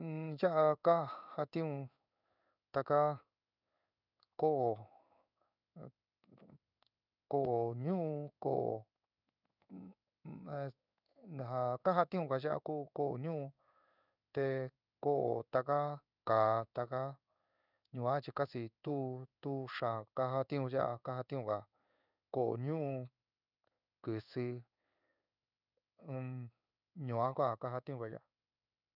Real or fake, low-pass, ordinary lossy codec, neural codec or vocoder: fake; 5.4 kHz; none; codec, 16 kHz in and 24 kHz out, 1 kbps, XY-Tokenizer